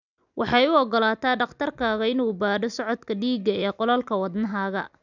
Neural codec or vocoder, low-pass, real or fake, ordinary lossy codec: none; 7.2 kHz; real; none